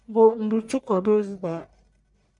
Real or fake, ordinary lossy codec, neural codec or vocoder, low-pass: fake; MP3, 64 kbps; codec, 44.1 kHz, 1.7 kbps, Pupu-Codec; 10.8 kHz